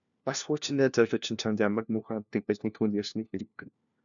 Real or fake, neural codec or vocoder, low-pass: fake; codec, 16 kHz, 1 kbps, FunCodec, trained on LibriTTS, 50 frames a second; 7.2 kHz